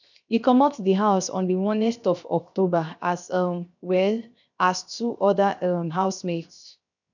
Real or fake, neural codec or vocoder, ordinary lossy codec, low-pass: fake; codec, 16 kHz, 0.7 kbps, FocalCodec; none; 7.2 kHz